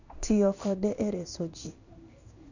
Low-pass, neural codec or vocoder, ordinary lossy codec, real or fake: 7.2 kHz; codec, 16 kHz in and 24 kHz out, 1 kbps, XY-Tokenizer; none; fake